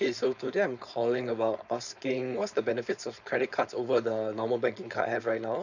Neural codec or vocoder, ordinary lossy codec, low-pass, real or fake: codec, 16 kHz, 4.8 kbps, FACodec; none; 7.2 kHz; fake